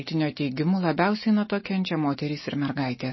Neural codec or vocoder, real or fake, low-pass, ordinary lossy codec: none; real; 7.2 kHz; MP3, 24 kbps